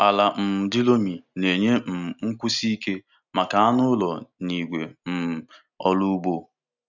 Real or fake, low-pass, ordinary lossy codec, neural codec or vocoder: real; 7.2 kHz; none; none